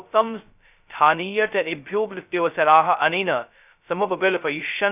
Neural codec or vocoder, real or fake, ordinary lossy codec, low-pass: codec, 16 kHz, 0.2 kbps, FocalCodec; fake; none; 3.6 kHz